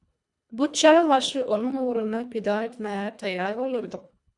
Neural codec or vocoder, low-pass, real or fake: codec, 24 kHz, 1.5 kbps, HILCodec; 10.8 kHz; fake